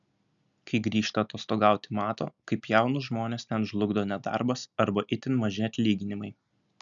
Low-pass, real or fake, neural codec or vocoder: 7.2 kHz; real; none